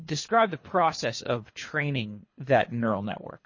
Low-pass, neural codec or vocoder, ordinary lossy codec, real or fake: 7.2 kHz; codec, 24 kHz, 3 kbps, HILCodec; MP3, 32 kbps; fake